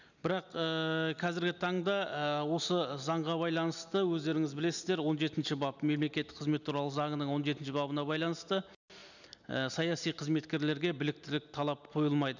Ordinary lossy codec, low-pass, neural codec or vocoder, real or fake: none; 7.2 kHz; none; real